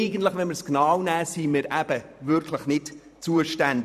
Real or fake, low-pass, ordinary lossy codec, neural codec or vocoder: fake; 14.4 kHz; none; vocoder, 44.1 kHz, 128 mel bands every 512 samples, BigVGAN v2